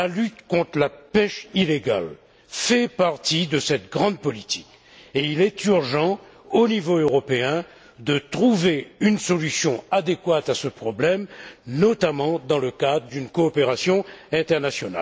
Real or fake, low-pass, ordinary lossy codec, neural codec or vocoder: real; none; none; none